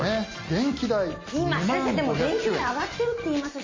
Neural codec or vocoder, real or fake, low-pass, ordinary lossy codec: none; real; 7.2 kHz; MP3, 32 kbps